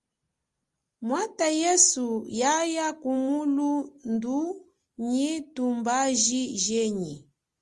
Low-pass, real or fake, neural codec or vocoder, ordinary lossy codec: 10.8 kHz; real; none; Opus, 32 kbps